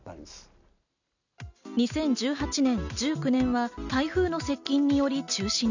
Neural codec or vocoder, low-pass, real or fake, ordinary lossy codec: none; 7.2 kHz; real; none